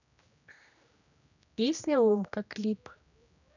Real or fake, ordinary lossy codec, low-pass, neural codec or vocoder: fake; none; 7.2 kHz; codec, 16 kHz, 1 kbps, X-Codec, HuBERT features, trained on general audio